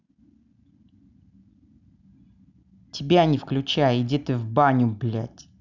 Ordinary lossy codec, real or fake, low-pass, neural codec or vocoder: none; real; 7.2 kHz; none